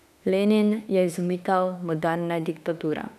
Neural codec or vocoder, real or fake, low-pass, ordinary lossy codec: autoencoder, 48 kHz, 32 numbers a frame, DAC-VAE, trained on Japanese speech; fake; 14.4 kHz; none